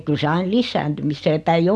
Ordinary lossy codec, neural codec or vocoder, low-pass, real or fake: none; none; none; real